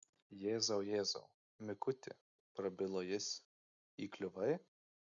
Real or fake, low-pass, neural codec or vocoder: real; 7.2 kHz; none